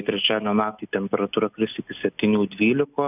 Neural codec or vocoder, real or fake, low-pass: none; real; 3.6 kHz